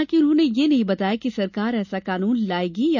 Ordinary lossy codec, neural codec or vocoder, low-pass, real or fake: none; none; 7.2 kHz; real